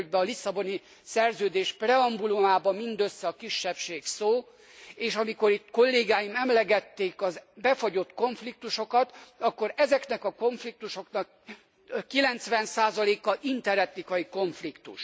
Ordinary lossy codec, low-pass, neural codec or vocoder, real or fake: none; none; none; real